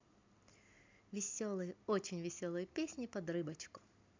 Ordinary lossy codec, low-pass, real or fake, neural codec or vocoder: MP3, 64 kbps; 7.2 kHz; real; none